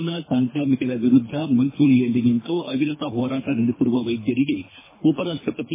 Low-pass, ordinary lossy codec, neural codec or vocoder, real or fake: 3.6 kHz; MP3, 16 kbps; codec, 24 kHz, 3 kbps, HILCodec; fake